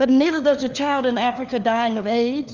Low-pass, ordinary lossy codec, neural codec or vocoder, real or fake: 7.2 kHz; Opus, 32 kbps; codec, 16 kHz, 4 kbps, FunCodec, trained on LibriTTS, 50 frames a second; fake